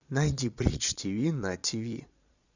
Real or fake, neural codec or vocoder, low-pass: fake; vocoder, 22.05 kHz, 80 mel bands, Vocos; 7.2 kHz